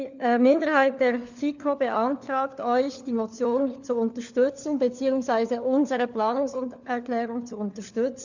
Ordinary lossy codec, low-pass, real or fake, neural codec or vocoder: none; 7.2 kHz; fake; codec, 16 kHz, 4 kbps, FunCodec, trained on LibriTTS, 50 frames a second